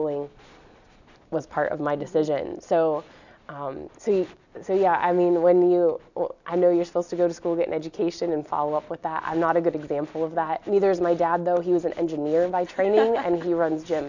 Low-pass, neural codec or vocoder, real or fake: 7.2 kHz; none; real